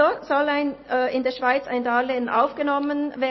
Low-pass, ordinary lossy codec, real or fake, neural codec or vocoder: 7.2 kHz; MP3, 24 kbps; real; none